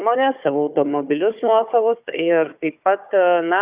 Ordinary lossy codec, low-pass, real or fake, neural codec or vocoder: Opus, 64 kbps; 3.6 kHz; fake; codec, 16 kHz, 4 kbps, FunCodec, trained on Chinese and English, 50 frames a second